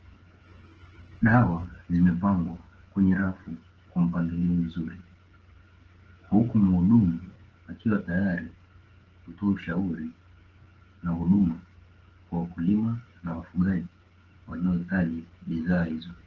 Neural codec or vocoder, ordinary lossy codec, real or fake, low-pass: codec, 16 kHz, 16 kbps, FreqCodec, smaller model; Opus, 16 kbps; fake; 7.2 kHz